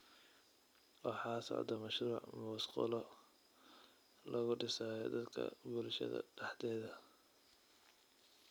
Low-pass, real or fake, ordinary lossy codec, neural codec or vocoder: none; real; none; none